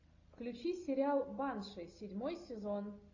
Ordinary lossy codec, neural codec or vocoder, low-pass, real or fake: AAC, 48 kbps; none; 7.2 kHz; real